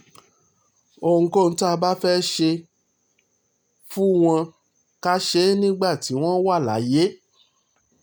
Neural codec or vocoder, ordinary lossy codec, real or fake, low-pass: none; none; real; none